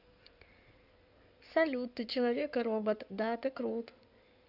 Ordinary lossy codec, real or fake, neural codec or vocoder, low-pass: none; fake; codec, 16 kHz in and 24 kHz out, 2.2 kbps, FireRedTTS-2 codec; 5.4 kHz